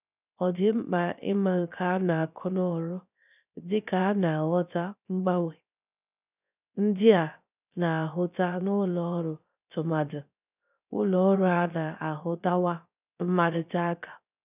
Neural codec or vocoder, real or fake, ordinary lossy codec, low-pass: codec, 16 kHz, 0.3 kbps, FocalCodec; fake; none; 3.6 kHz